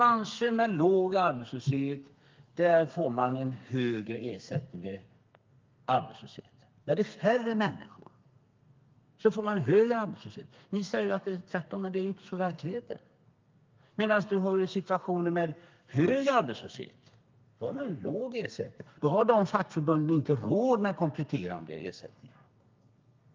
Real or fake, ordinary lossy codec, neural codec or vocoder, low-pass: fake; Opus, 24 kbps; codec, 32 kHz, 1.9 kbps, SNAC; 7.2 kHz